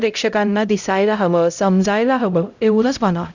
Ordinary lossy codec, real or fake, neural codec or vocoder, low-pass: none; fake; codec, 16 kHz, 0.5 kbps, X-Codec, HuBERT features, trained on LibriSpeech; 7.2 kHz